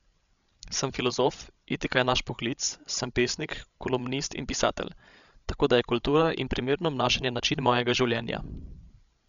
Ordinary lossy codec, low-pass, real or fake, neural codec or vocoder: none; 7.2 kHz; fake; codec, 16 kHz, 16 kbps, FreqCodec, larger model